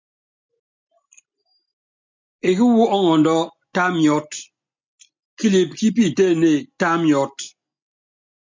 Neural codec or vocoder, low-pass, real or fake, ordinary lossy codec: none; 7.2 kHz; real; MP3, 64 kbps